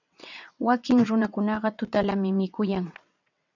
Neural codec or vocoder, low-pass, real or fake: vocoder, 22.05 kHz, 80 mel bands, WaveNeXt; 7.2 kHz; fake